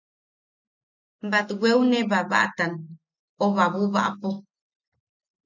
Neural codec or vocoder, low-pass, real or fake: none; 7.2 kHz; real